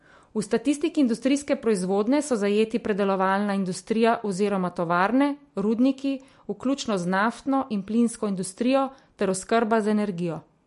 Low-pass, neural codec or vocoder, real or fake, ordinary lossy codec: 14.4 kHz; none; real; MP3, 48 kbps